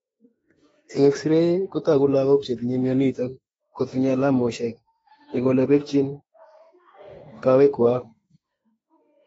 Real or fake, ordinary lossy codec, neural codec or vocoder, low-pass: fake; AAC, 24 kbps; autoencoder, 48 kHz, 32 numbers a frame, DAC-VAE, trained on Japanese speech; 19.8 kHz